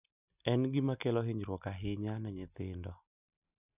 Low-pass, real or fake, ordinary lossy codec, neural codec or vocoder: 3.6 kHz; real; none; none